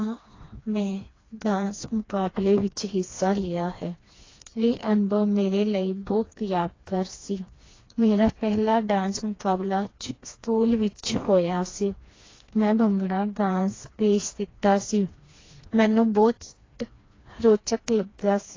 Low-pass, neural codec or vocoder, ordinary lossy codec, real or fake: 7.2 kHz; codec, 16 kHz, 2 kbps, FreqCodec, smaller model; AAC, 32 kbps; fake